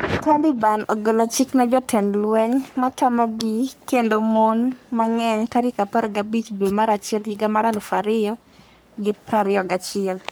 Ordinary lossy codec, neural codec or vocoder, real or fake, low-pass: none; codec, 44.1 kHz, 3.4 kbps, Pupu-Codec; fake; none